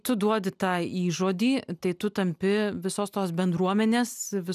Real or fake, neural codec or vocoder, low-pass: real; none; 14.4 kHz